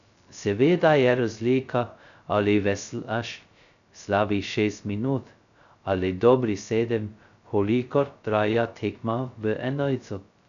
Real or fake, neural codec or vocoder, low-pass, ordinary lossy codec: fake; codec, 16 kHz, 0.2 kbps, FocalCodec; 7.2 kHz; none